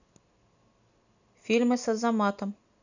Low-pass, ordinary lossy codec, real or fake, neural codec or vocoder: 7.2 kHz; none; real; none